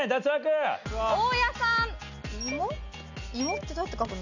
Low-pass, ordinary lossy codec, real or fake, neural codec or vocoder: 7.2 kHz; none; real; none